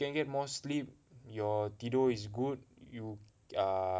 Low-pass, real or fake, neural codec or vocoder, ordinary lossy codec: none; real; none; none